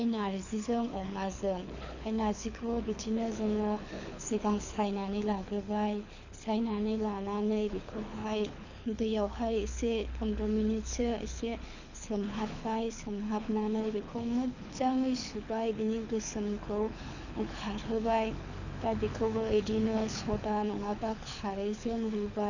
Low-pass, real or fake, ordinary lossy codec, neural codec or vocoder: 7.2 kHz; fake; none; codec, 24 kHz, 6 kbps, HILCodec